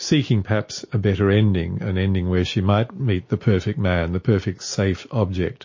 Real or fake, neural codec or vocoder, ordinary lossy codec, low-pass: real; none; MP3, 32 kbps; 7.2 kHz